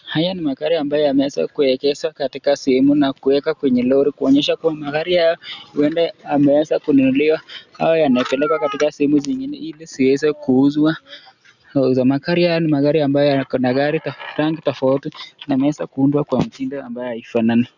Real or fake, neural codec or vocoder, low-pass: real; none; 7.2 kHz